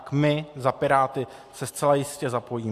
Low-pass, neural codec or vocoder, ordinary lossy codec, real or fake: 14.4 kHz; none; AAC, 64 kbps; real